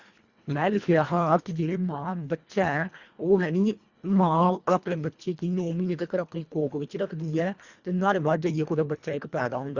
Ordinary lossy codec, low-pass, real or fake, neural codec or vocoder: Opus, 64 kbps; 7.2 kHz; fake; codec, 24 kHz, 1.5 kbps, HILCodec